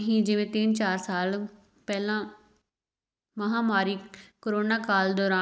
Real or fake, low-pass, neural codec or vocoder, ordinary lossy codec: real; none; none; none